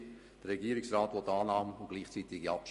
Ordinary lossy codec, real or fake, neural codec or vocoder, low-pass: MP3, 48 kbps; real; none; 14.4 kHz